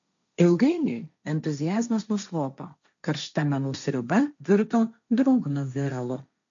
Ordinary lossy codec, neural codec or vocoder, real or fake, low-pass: MP3, 64 kbps; codec, 16 kHz, 1.1 kbps, Voila-Tokenizer; fake; 7.2 kHz